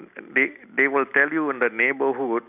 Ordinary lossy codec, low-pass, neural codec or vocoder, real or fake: none; 3.6 kHz; none; real